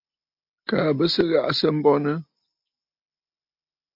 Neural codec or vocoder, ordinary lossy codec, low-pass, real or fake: none; MP3, 48 kbps; 5.4 kHz; real